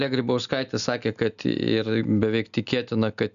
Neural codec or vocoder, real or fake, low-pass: none; real; 7.2 kHz